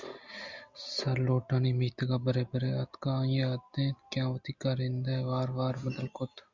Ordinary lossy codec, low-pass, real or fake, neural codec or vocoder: Opus, 64 kbps; 7.2 kHz; real; none